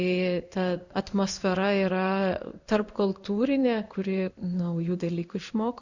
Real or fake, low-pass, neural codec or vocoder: fake; 7.2 kHz; codec, 16 kHz in and 24 kHz out, 1 kbps, XY-Tokenizer